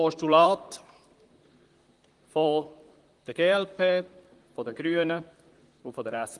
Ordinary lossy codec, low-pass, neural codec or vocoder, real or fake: Opus, 24 kbps; 10.8 kHz; vocoder, 44.1 kHz, 128 mel bands, Pupu-Vocoder; fake